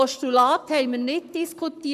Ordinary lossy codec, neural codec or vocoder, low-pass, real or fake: none; codec, 44.1 kHz, 7.8 kbps, Pupu-Codec; 14.4 kHz; fake